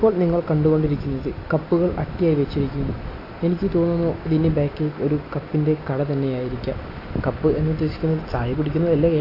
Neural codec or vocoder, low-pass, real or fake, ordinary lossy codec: none; 5.4 kHz; real; AAC, 32 kbps